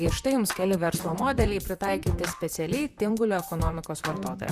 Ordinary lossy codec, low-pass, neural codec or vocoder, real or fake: Opus, 64 kbps; 14.4 kHz; vocoder, 44.1 kHz, 128 mel bands, Pupu-Vocoder; fake